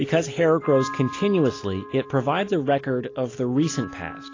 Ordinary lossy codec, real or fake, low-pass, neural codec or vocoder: AAC, 32 kbps; real; 7.2 kHz; none